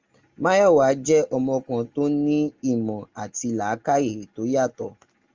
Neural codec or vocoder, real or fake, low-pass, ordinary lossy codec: none; real; 7.2 kHz; Opus, 32 kbps